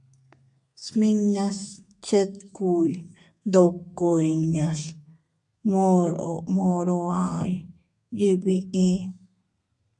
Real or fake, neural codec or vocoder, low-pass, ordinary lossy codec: fake; codec, 32 kHz, 1.9 kbps, SNAC; 10.8 kHz; MP3, 64 kbps